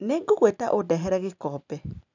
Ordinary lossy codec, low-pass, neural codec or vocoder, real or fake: none; 7.2 kHz; none; real